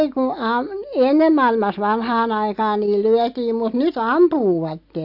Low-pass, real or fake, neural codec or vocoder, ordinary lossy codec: 5.4 kHz; real; none; AAC, 48 kbps